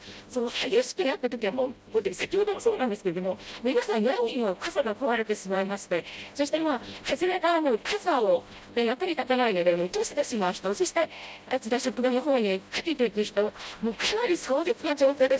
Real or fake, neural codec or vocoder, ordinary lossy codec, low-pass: fake; codec, 16 kHz, 0.5 kbps, FreqCodec, smaller model; none; none